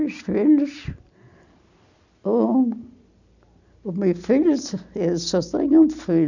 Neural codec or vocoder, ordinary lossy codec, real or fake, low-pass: none; none; real; 7.2 kHz